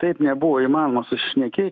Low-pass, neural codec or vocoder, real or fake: 7.2 kHz; autoencoder, 48 kHz, 128 numbers a frame, DAC-VAE, trained on Japanese speech; fake